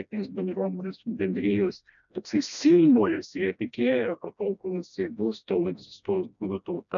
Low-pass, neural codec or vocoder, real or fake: 7.2 kHz; codec, 16 kHz, 1 kbps, FreqCodec, smaller model; fake